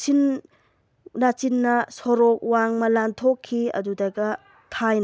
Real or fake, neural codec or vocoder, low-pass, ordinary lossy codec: real; none; none; none